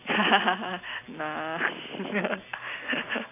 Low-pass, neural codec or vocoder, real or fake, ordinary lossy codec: 3.6 kHz; none; real; AAC, 24 kbps